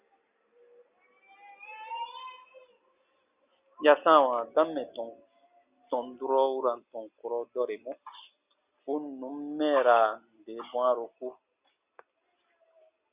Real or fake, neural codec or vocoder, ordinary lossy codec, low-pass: real; none; Opus, 64 kbps; 3.6 kHz